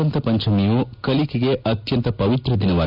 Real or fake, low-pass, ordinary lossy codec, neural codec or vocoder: real; 5.4 kHz; AAC, 48 kbps; none